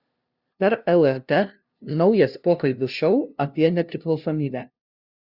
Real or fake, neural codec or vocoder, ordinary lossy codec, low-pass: fake; codec, 16 kHz, 0.5 kbps, FunCodec, trained on LibriTTS, 25 frames a second; Opus, 64 kbps; 5.4 kHz